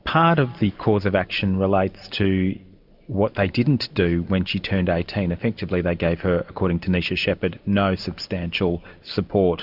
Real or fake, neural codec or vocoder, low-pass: real; none; 5.4 kHz